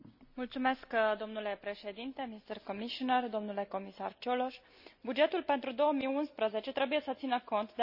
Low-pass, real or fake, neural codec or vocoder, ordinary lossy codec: 5.4 kHz; real; none; none